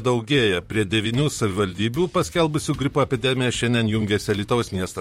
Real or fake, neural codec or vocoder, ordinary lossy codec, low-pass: fake; vocoder, 44.1 kHz, 128 mel bands, Pupu-Vocoder; MP3, 64 kbps; 19.8 kHz